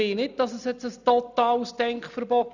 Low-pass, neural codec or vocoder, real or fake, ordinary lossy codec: 7.2 kHz; none; real; none